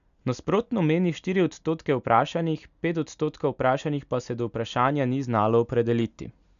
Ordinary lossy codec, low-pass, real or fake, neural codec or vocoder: none; 7.2 kHz; real; none